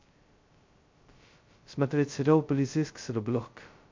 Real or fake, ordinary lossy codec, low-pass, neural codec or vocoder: fake; MP3, 48 kbps; 7.2 kHz; codec, 16 kHz, 0.2 kbps, FocalCodec